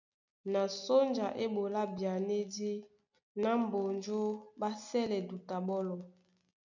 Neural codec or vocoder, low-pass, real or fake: none; 7.2 kHz; real